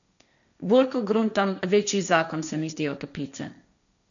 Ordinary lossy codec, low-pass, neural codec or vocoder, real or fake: none; 7.2 kHz; codec, 16 kHz, 1.1 kbps, Voila-Tokenizer; fake